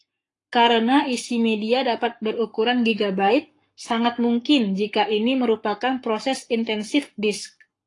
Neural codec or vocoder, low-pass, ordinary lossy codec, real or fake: codec, 44.1 kHz, 7.8 kbps, Pupu-Codec; 10.8 kHz; AAC, 48 kbps; fake